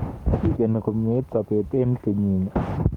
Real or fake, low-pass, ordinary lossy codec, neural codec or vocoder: fake; 19.8 kHz; Opus, 32 kbps; codec, 44.1 kHz, 7.8 kbps, Pupu-Codec